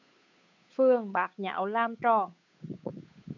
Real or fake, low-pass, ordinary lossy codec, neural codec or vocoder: fake; 7.2 kHz; MP3, 64 kbps; codec, 16 kHz, 8 kbps, FunCodec, trained on Chinese and English, 25 frames a second